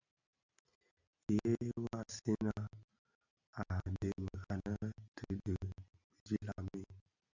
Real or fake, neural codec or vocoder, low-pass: real; none; 7.2 kHz